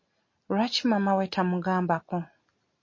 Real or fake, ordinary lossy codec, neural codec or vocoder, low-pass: real; MP3, 32 kbps; none; 7.2 kHz